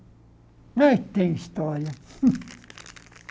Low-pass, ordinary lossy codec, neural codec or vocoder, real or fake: none; none; none; real